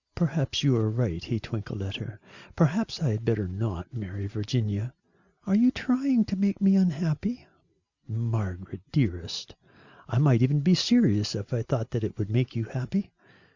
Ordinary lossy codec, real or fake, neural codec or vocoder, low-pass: Opus, 64 kbps; real; none; 7.2 kHz